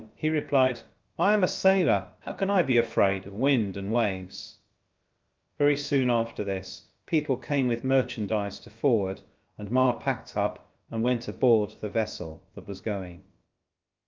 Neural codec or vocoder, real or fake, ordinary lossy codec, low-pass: codec, 16 kHz, about 1 kbps, DyCAST, with the encoder's durations; fake; Opus, 24 kbps; 7.2 kHz